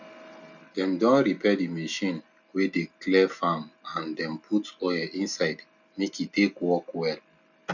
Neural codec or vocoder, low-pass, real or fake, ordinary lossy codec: none; 7.2 kHz; real; none